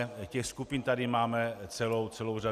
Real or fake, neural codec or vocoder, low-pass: real; none; 14.4 kHz